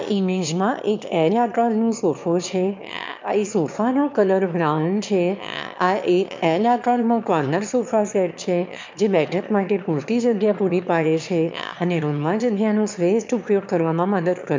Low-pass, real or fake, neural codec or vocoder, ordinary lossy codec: 7.2 kHz; fake; autoencoder, 22.05 kHz, a latent of 192 numbers a frame, VITS, trained on one speaker; MP3, 64 kbps